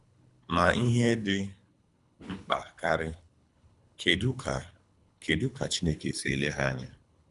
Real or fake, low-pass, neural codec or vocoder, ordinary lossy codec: fake; 10.8 kHz; codec, 24 kHz, 3 kbps, HILCodec; none